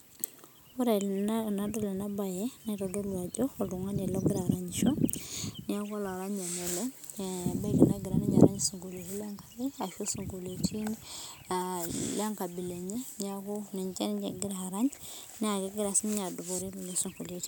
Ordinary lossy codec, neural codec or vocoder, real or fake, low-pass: none; none; real; none